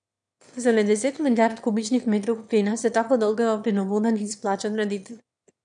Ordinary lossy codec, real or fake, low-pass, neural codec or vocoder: none; fake; 9.9 kHz; autoencoder, 22.05 kHz, a latent of 192 numbers a frame, VITS, trained on one speaker